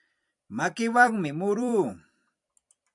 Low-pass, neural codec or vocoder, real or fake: 10.8 kHz; vocoder, 44.1 kHz, 128 mel bands every 256 samples, BigVGAN v2; fake